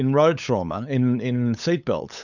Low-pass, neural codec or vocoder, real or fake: 7.2 kHz; codec, 16 kHz, 8 kbps, FunCodec, trained on LibriTTS, 25 frames a second; fake